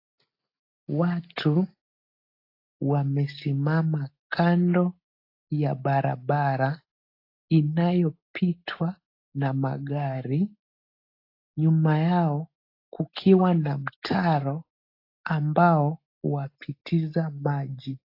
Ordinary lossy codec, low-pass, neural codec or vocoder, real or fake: AAC, 32 kbps; 5.4 kHz; none; real